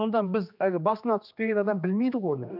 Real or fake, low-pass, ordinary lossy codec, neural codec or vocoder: fake; 5.4 kHz; none; codec, 16 kHz, 2 kbps, X-Codec, HuBERT features, trained on general audio